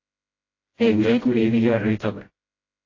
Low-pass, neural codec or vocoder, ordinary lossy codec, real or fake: 7.2 kHz; codec, 16 kHz, 0.5 kbps, FreqCodec, smaller model; AAC, 32 kbps; fake